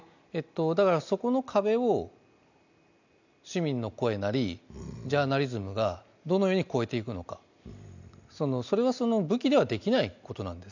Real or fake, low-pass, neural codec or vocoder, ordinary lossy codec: real; 7.2 kHz; none; none